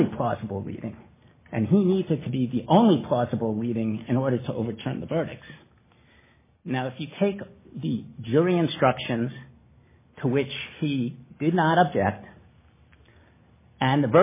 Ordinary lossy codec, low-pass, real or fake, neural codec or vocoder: MP3, 16 kbps; 3.6 kHz; real; none